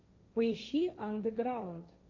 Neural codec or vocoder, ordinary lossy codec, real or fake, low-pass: codec, 16 kHz, 1.1 kbps, Voila-Tokenizer; MP3, 48 kbps; fake; 7.2 kHz